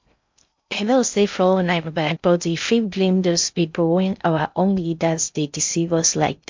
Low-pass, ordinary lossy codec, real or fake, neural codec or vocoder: 7.2 kHz; MP3, 64 kbps; fake; codec, 16 kHz in and 24 kHz out, 0.6 kbps, FocalCodec, streaming, 2048 codes